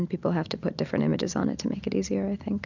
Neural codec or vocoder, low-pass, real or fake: none; 7.2 kHz; real